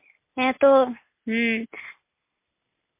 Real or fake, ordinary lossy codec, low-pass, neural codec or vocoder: real; MP3, 24 kbps; 3.6 kHz; none